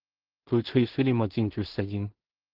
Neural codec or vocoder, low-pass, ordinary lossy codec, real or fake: codec, 16 kHz in and 24 kHz out, 0.4 kbps, LongCat-Audio-Codec, two codebook decoder; 5.4 kHz; Opus, 16 kbps; fake